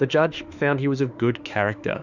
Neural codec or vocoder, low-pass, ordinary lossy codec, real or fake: autoencoder, 48 kHz, 32 numbers a frame, DAC-VAE, trained on Japanese speech; 7.2 kHz; Opus, 64 kbps; fake